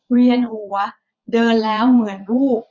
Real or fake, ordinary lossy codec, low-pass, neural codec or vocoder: fake; none; 7.2 kHz; vocoder, 22.05 kHz, 80 mel bands, Vocos